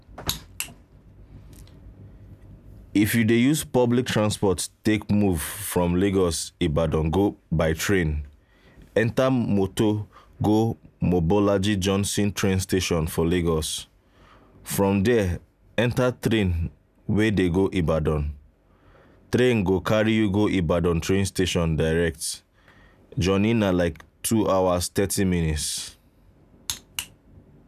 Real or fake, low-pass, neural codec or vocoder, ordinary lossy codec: real; 14.4 kHz; none; none